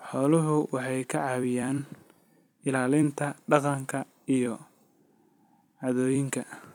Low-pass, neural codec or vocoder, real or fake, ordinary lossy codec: 19.8 kHz; vocoder, 44.1 kHz, 128 mel bands every 256 samples, BigVGAN v2; fake; none